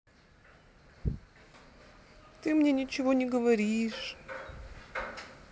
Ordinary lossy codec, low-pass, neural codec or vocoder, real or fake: none; none; none; real